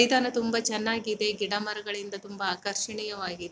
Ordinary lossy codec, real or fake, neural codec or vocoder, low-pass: none; real; none; none